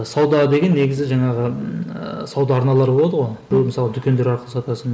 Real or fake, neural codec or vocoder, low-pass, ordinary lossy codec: real; none; none; none